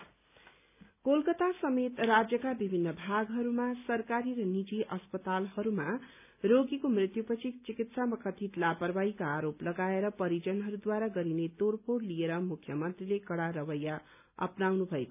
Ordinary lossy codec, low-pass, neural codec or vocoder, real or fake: none; 3.6 kHz; none; real